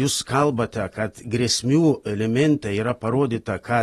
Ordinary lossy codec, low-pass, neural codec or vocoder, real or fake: AAC, 32 kbps; 14.4 kHz; none; real